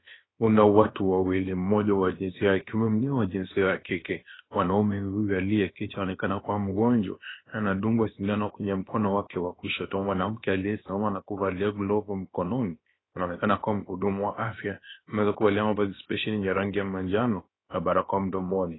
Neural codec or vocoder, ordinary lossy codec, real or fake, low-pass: codec, 16 kHz, about 1 kbps, DyCAST, with the encoder's durations; AAC, 16 kbps; fake; 7.2 kHz